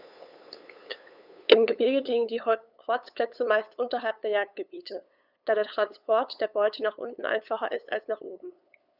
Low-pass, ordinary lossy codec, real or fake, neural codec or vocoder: 5.4 kHz; none; fake; codec, 16 kHz, 8 kbps, FunCodec, trained on LibriTTS, 25 frames a second